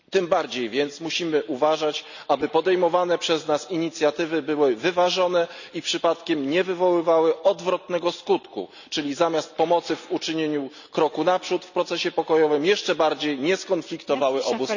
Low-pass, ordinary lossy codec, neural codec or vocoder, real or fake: 7.2 kHz; none; none; real